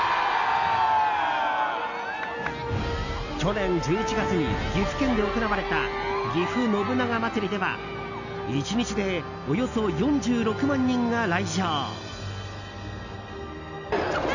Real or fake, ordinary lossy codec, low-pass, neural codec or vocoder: real; none; 7.2 kHz; none